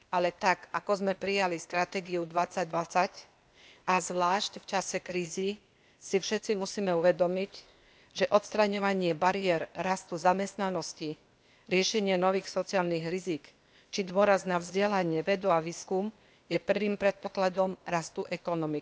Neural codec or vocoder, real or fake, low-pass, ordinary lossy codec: codec, 16 kHz, 0.8 kbps, ZipCodec; fake; none; none